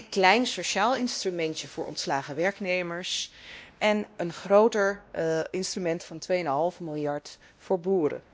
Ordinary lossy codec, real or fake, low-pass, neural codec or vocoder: none; fake; none; codec, 16 kHz, 1 kbps, X-Codec, WavLM features, trained on Multilingual LibriSpeech